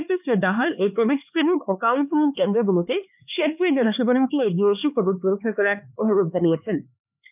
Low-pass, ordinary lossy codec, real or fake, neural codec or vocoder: 3.6 kHz; none; fake; codec, 16 kHz, 2 kbps, X-Codec, HuBERT features, trained on LibriSpeech